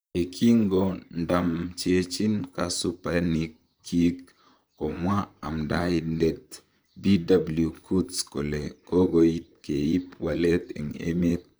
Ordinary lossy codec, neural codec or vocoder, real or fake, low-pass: none; vocoder, 44.1 kHz, 128 mel bands, Pupu-Vocoder; fake; none